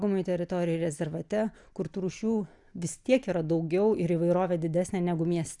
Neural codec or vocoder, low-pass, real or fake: none; 10.8 kHz; real